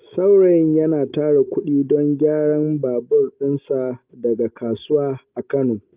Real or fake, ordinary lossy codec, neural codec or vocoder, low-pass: real; Opus, 32 kbps; none; 3.6 kHz